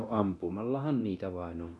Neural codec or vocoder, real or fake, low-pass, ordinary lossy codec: codec, 24 kHz, 0.9 kbps, DualCodec; fake; none; none